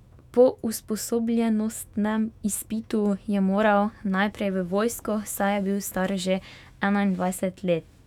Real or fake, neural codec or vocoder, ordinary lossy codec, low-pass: fake; autoencoder, 48 kHz, 128 numbers a frame, DAC-VAE, trained on Japanese speech; none; 19.8 kHz